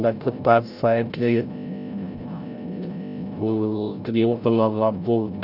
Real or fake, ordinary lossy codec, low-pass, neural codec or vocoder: fake; none; 5.4 kHz; codec, 16 kHz, 0.5 kbps, FreqCodec, larger model